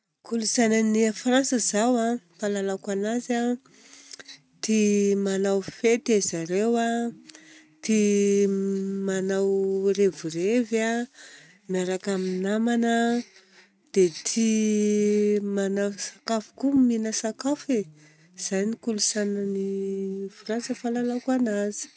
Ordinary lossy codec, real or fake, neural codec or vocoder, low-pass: none; real; none; none